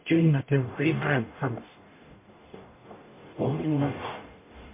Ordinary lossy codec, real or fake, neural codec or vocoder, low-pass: MP3, 24 kbps; fake; codec, 44.1 kHz, 0.9 kbps, DAC; 3.6 kHz